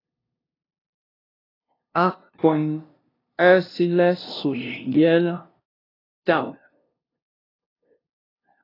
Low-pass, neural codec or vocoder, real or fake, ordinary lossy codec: 5.4 kHz; codec, 16 kHz, 0.5 kbps, FunCodec, trained on LibriTTS, 25 frames a second; fake; AAC, 24 kbps